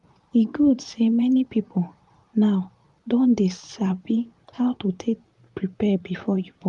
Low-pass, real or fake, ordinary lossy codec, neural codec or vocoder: 10.8 kHz; fake; Opus, 32 kbps; vocoder, 44.1 kHz, 128 mel bands, Pupu-Vocoder